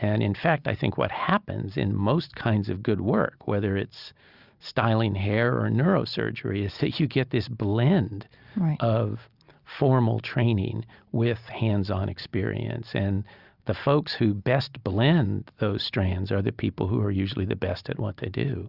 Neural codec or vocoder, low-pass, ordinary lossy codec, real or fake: none; 5.4 kHz; Opus, 64 kbps; real